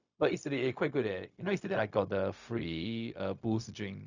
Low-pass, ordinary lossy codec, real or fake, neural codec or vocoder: 7.2 kHz; none; fake; codec, 16 kHz, 0.4 kbps, LongCat-Audio-Codec